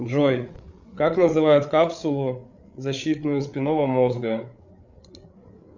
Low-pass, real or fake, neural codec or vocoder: 7.2 kHz; fake; codec, 16 kHz, 8 kbps, FreqCodec, larger model